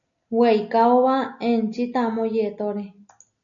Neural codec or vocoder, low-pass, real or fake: none; 7.2 kHz; real